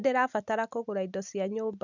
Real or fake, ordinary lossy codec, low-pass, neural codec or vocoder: real; none; 7.2 kHz; none